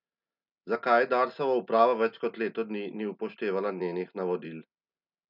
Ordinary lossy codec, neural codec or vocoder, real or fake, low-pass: none; none; real; 5.4 kHz